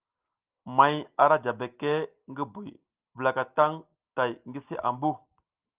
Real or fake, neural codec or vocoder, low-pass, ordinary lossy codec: real; none; 3.6 kHz; Opus, 24 kbps